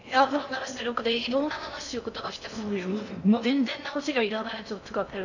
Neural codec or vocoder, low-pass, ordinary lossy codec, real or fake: codec, 16 kHz in and 24 kHz out, 0.6 kbps, FocalCodec, streaming, 4096 codes; 7.2 kHz; none; fake